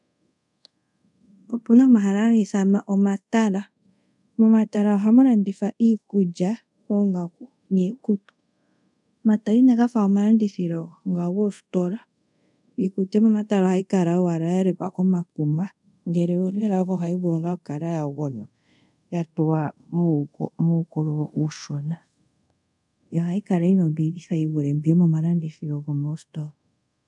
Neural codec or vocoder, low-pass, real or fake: codec, 24 kHz, 0.5 kbps, DualCodec; 10.8 kHz; fake